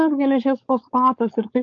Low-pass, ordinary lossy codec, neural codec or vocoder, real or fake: 7.2 kHz; AAC, 48 kbps; codec, 16 kHz, 4.8 kbps, FACodec; fake